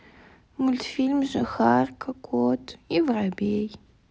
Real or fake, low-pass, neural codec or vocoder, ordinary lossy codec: real; none; none; none